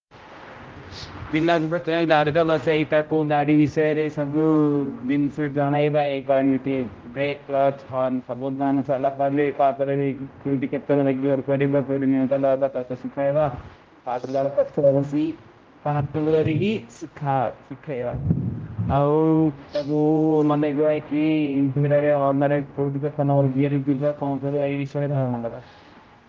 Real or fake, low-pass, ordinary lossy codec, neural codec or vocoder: fake; 7.2 kHz; Opus, 24 kbps; codec, 16 kHz, 0.5 kbps, X-Codec, HuBERT features, trained on general audio